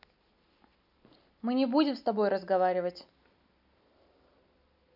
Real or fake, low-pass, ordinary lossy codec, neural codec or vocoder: real; 5.4 kHz; none; none